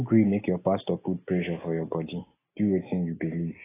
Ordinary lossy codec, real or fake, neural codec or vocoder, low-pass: AAC, 16 kbps; real; none; 3.6 kHz